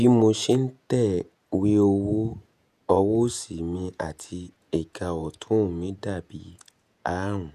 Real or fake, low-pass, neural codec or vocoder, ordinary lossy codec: fake; 14.4 kHz; vocoder, 48 kHz, 128 mel bands, Vocos; none